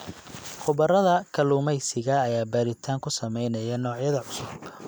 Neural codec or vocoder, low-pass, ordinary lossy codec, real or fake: none; none; none; real